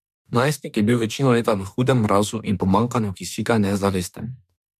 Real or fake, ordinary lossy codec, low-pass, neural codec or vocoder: fake; MP3, 96 kbps; 14.4 kHz; autoencoder, 48 kHz, 32 numbers a frame, DAC-VAE, trained on Japanese speech